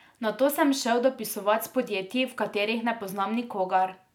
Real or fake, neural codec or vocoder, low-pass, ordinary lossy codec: real; none; 19.8 kHz; none